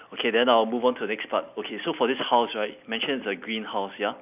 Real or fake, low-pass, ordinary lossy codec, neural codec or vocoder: real; 3.6 kHz; none; none